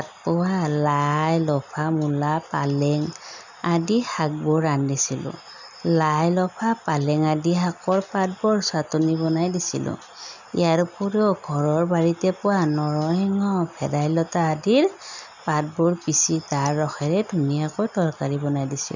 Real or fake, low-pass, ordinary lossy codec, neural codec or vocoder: real; 7.2 kHz; none; none